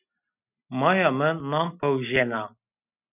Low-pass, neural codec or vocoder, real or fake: 3.6 kHz; none; real